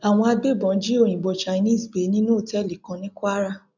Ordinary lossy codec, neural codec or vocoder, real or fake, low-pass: none; none; real; 7.2 kHz